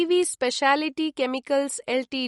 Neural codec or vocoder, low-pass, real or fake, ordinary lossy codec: none; 19.8 kHz; real; MP3, 48 kbps